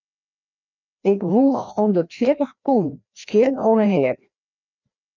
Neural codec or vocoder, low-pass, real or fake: codec, 16 kHz, 1 kbps, FreqCodec, larger model; 7.2 kHz; fake